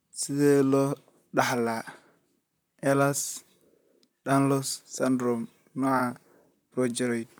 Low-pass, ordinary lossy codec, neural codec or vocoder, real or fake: none; none; vocoder, 44.1 kHz, 128 mel bands, Pupu-Vocoder; fake